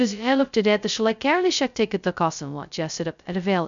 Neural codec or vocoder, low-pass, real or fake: codec, 16 kHz, 0.2 kbps, FocalCodec; 7.2 kHz; fake